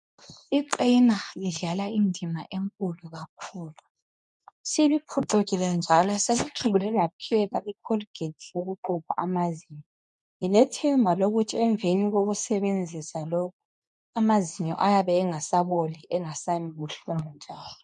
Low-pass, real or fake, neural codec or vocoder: 10.8 kHz; fake; codec, 24 kHz, 0.9 kbps, WavTokenizer, medium speech release version 2